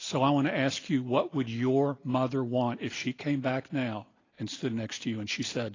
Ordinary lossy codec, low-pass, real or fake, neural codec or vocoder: AAC, 32 kbps; 7.2 kHz; real; none